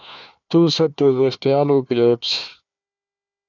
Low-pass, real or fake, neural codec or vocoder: 7.2 kHz; fake; codec, 16 kHz, 1 kbps, FunCodec, trained on Chinese and English, 50 frames a second